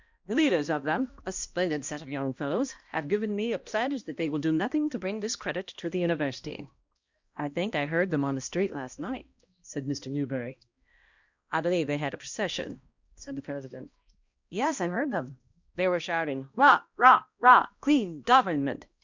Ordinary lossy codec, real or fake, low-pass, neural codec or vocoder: Opus, 64 kbps; fake; 7.2 kHz; codec, 16 kHz, 1 kbps, X-Codec, HuBERT features, trained on balanced general audio